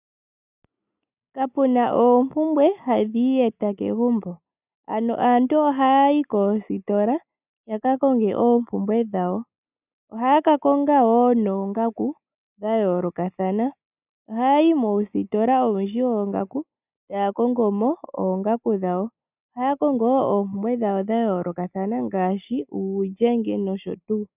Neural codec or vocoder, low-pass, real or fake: none; 3.6 kHz; real